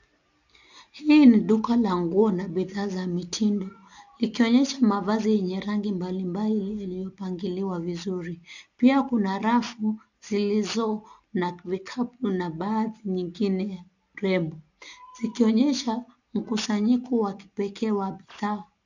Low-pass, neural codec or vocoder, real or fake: 7.2 kHz; none; real